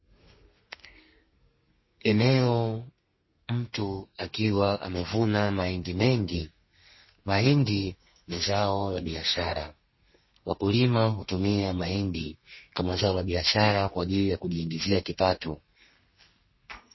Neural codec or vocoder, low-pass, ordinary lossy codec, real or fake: codec, 32 kHz, 1.9 kbps, SNAC; 7.2 kHz; MP3, 24 kbps; fake